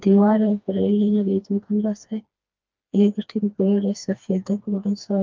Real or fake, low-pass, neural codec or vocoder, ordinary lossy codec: fake; 7.2 kHz; codec, 16 kHz, 2 kbps, FreqCodec, smaller model; Opus, 32 kbps